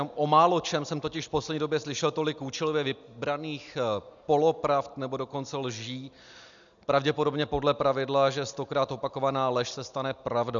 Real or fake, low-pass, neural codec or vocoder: real; 7.2 kHz; none